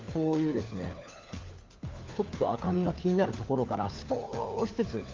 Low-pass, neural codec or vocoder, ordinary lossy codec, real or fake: 7.2 kHz; codec, 16 kHz, 4 kbps, FunCodec, trained on LibriTTS, 50 frames a second; Opus, 32 kbps; fake